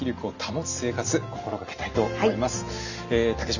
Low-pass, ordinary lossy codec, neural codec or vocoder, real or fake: 7.2 kHz; none; none; real